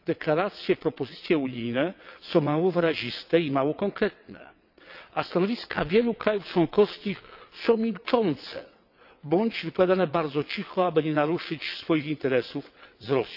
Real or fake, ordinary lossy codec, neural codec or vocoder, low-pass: fake; none; vocoder, 22.05 kHz, 80 mel bands, WaveNeXt; 5.4 kHz